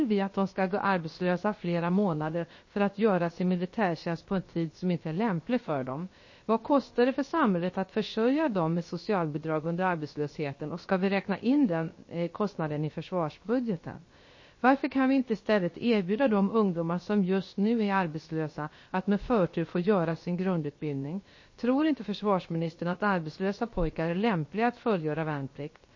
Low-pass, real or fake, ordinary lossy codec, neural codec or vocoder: 7.2 kHz; fake; MP3, 32 kbps; codec, 16 kHz, about 1 kbps, DyCAST, with the encoder's durations